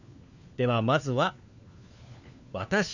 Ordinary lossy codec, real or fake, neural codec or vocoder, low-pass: none; fake; codec, 16 kHz, 4 kbps, FunCodec, trained on LibriTTS, 50 frames a second; 7.2 kHz